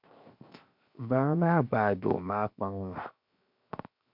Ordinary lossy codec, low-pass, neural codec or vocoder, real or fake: MP3, 48 kbps; 5.4 kHz; codec, 16 kHz, 0.7 kbps, FocalCodec; fake